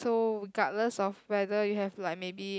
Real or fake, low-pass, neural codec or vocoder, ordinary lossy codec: real; none; none; none